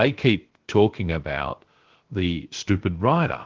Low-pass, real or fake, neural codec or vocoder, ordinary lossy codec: 7.2 kHz; fake; codec, 16 kHz, about 1 kbps, DyCAST, with the encoder's durations; Opus, 16 kbps